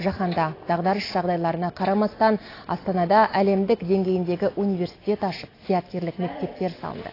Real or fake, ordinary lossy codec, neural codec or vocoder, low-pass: real; AAC, 24 kbps; none; 5.4 kHz